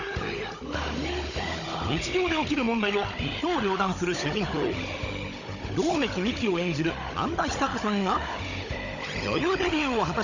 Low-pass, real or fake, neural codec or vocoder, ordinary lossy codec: 7.2 kHz; fake; codec, 16 kHz, 16 kbps, FunCodec, trained on Chinese and English, 50 frames a second; Opus, 64 kbps